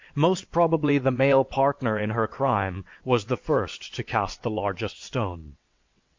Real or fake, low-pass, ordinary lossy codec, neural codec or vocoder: fake; 7.2 kHz; MP3, 48 kbps; vocoder, 22.05 kHz, 80 mel bands, WaveNeXt